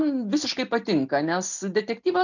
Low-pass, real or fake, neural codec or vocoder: 7.2 kHz; real; none